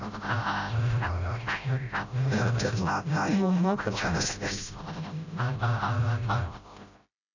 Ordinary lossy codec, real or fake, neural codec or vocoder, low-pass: none; fake; codec, 16 kHz, 0.5 kbps, FreqCodec, smaller model; 7.2 kHz